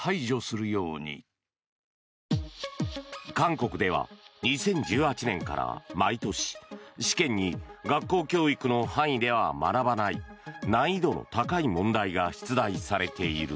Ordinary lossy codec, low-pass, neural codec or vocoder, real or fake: none; none; none; real